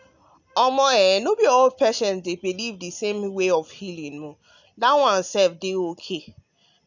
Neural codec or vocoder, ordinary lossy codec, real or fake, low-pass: none; none; real; 7.2 kHz